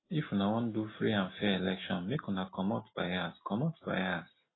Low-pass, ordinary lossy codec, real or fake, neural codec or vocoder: 7.2 kHz; AAC, 16 kbps; real; none